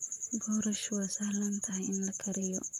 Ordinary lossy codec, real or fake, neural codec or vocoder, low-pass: none; fake; vocoder, 44.1 kHz, 128 mel bands, Pupu-Vocoder; 19.8 kHz